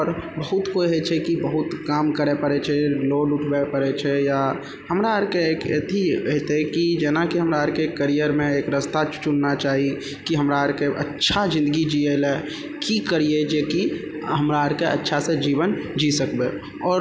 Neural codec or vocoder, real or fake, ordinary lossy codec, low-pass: none; real; none; none